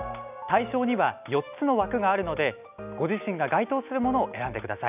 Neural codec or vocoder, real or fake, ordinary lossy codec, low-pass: none; real; none; 3.6 kHz